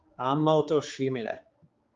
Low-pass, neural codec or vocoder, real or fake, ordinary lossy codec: 7.2 kHz; codec, 16 kHz, 4 kbps, X-Codec, HuBERT features, trained on general audio; fake; Opus, 32 kbps